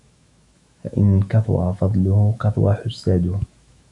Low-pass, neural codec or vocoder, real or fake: 10.8 kHz; autoencoder, 48 kHz, 128 numbers a frame, DAC-VAE, trained on Japanese speech; fake